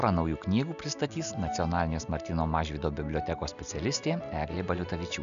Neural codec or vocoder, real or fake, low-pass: none; real; 7.2 kHz